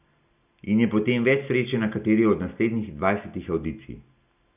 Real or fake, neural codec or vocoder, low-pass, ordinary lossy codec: real; none; 3.6 kHz; none